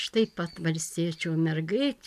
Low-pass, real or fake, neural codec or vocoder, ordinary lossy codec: 14.4 kHz; real; none; AAC, 96 kbps